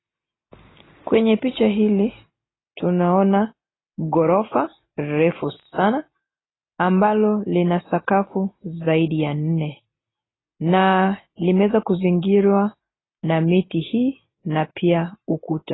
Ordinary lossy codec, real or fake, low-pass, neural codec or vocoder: AAC, 16 kbps; real; 7.2 kHz; none